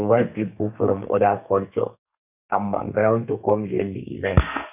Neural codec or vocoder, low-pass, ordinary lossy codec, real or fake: codec, 32 kHz, 1.9 kbps, SNAC; 3.6 kHz; none; fake